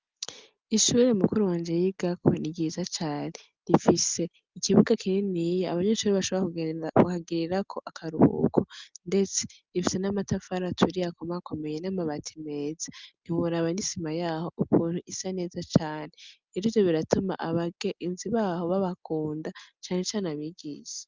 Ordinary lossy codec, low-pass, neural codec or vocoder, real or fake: Opus, 24 kbps; 7.2 kHz; none; real